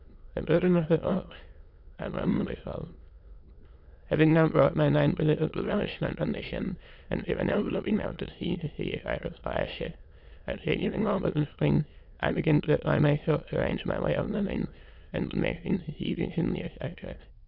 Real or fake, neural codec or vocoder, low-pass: fake; autoencoder, 22.05 kHz, a latent of 192 numbers a frame, VITS, trained on many speakers; 5.4 kHz